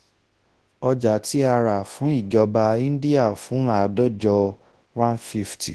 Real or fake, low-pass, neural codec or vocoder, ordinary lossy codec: fake; 10.8 kHz; codec, 24 kHz, 0.9 kbps, WavTokenizer, large speech release; Opus, 16 kbps